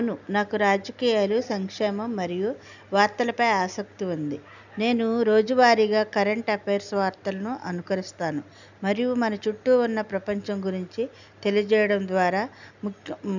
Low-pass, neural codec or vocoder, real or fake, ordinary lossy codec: 7.2 kHz; none; real; none